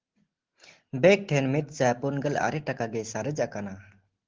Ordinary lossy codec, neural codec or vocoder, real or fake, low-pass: Opus, 16 kbps; none; real; 7.2 kHz